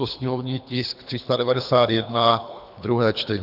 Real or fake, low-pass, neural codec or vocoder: fake; 5.4 kHz; codec, 24 kHz, 3 kbps, HILCodec